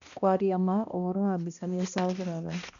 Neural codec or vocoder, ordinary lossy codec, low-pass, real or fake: codec, 16 kHz, 1 kbps, X-Codec, HuBERT features, trained on balanced general audio; none; 7.2 kHz; fake